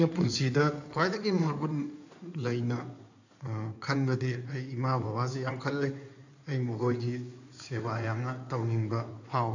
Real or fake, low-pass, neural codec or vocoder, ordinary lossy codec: fake; 7.2 kHz; codec, 16 kHz in and 24 kHz out, 2.2 kbps, FireRedTTS-2 codec; none